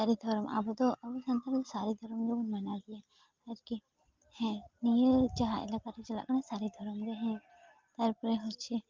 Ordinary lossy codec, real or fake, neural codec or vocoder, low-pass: Opus, 32 kbps; real; none; 7.2 kHz